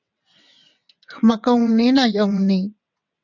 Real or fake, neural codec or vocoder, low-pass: fake; vocoder, 22.05 kHz, 80 mel bands, WaveNeXt; 7.2 kHz